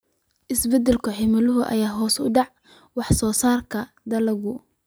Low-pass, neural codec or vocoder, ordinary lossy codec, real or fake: none; none; none; real